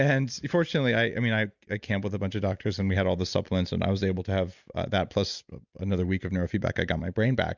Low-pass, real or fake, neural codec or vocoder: 7.2 kHz; real; none